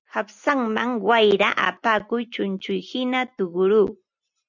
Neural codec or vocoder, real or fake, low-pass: none; real; 7.2 kHz